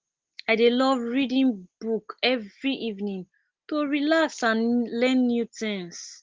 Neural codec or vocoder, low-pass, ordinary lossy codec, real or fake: none; 7.2 kHz; Opus, 16 kbps; real